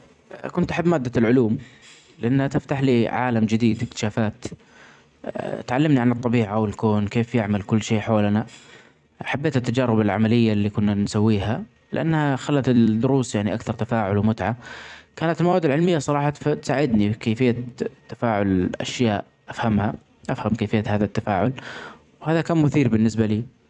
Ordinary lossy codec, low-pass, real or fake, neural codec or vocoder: none; 10.8 kHz; fake; vocoder, 44.1 kHz, 128 mel bands every 256 samples, BigVGAN v2